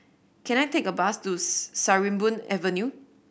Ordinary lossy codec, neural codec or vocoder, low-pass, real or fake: none; none; none; real